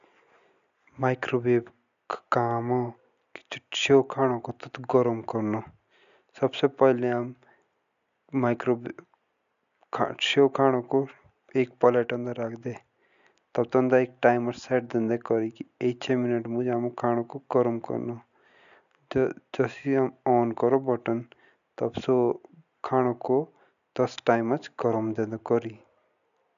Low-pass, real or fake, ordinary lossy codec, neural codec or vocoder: 7.2 kHz; real; none; none